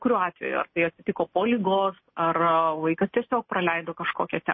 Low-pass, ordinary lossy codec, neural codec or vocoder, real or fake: 7.2 kHz; MP3, 24 kbps; none; real